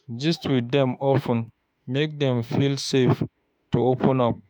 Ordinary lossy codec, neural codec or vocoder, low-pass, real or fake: none; autoencoder, 48 kHz, 32 numbers a frame, DAC-VAE, trained on Japanese speech; none; fake